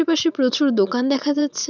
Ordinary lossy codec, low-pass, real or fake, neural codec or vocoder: none; 7.2 kHz; fake; vocoder, 44.1 kHz, 80 mel bands, Vocos